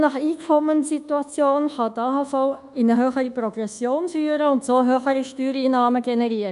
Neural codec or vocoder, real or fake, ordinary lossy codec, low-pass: codec, 24 kHz, 1.2 kbps, DualCodec; fake; none; 10.8 kHz